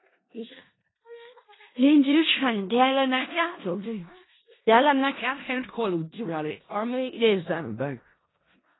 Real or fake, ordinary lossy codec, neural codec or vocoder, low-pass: fake; AAC, 16 kbps; codec, 16 kHz in and 24 kHz out, 0.4 kbps, LongCat-Audio-Codec, four codebook decoder; 7.2 kHz